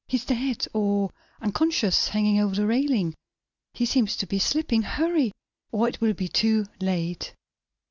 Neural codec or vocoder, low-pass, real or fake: none; 7.2 kHz; real